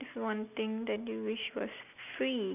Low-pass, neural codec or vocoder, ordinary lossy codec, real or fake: 3.6 kHz; none; none; real